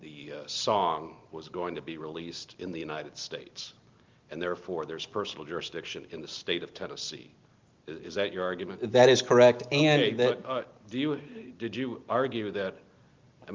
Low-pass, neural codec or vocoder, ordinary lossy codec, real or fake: 7.2 kHz; none; Opus, 32 kbps; real